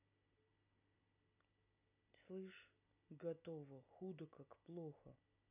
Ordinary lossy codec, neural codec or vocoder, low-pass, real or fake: none; none; 3.6 kHz; real